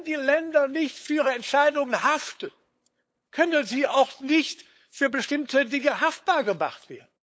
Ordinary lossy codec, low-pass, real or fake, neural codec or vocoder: none; none; fake; codec, 16 kHz, 8 kbps, FunCodec, trained on LibriTTS, 25 frames a second